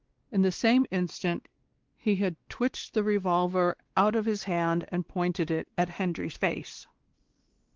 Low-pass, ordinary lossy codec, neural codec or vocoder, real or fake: 7.2 kHz; Opus, 16 kbps; codec, 16 kHz, 2 kbps, X-Codec, WavLM features, trained on Multilingual LibriSpeech; fake